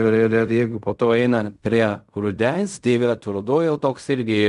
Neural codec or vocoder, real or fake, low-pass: codec, 16 kHz in and 24 kHz out, 0.4 kbps, LongCat-Audio-Codec, fine tuned four codebook decoder; fake; 10.8 kHz